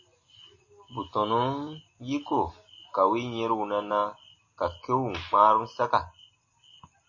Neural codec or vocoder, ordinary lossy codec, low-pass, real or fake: none; MP3, 32 kbps; 7.2 kHz; real